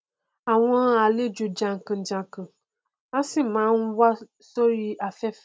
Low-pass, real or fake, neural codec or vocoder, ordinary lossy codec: none; real; none; none